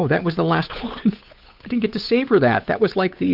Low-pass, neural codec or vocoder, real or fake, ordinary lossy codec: 5.4 kHz; codec, 16 kHz, 8 kbps, FunCodec, trained on Chinese and English, 25 frames a second; fake; Opus, 64 kbps